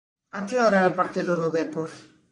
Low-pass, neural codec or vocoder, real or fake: 10.8 kHz; codec, 44.1 kHz, 1.7 kbps, Pupu-Codec; fake